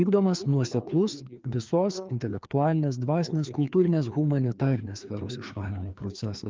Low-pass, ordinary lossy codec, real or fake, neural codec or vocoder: 7.2 kHz; Opus, 32 kbps; fake; codec, 16 kHz, 2 kbps, FreqCodec, larger model